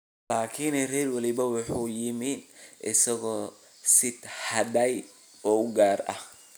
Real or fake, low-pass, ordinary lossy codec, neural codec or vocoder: real; none; none; none